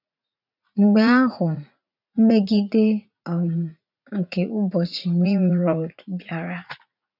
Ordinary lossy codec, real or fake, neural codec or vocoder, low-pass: none; fake; vocoder, 44.1 kHz, 80 mel bands, Vocos; 5.4 kHz